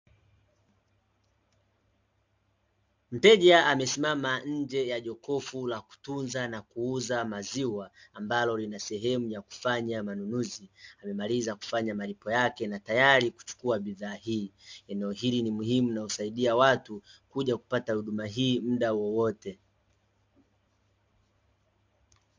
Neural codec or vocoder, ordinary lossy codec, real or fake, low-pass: none; MP3, 64 kbps; real; 7.2 kHz